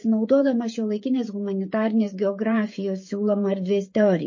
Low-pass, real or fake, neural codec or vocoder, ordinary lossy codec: 7.2 kHz; fake; codec, 16 kHz, 16 kbps, FreqCodec, smaller model; MP3, 32 kbps